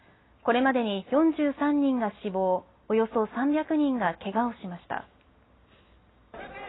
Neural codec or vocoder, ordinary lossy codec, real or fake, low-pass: none; AAC, 16 kbps; real; 7.2 kHz